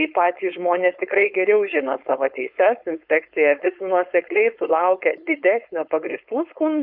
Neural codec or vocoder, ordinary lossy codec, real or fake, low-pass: codec, 16 kHz, 4.8 kbps, FACodec; Opus, 64 kbps; fake; 5.4 kHz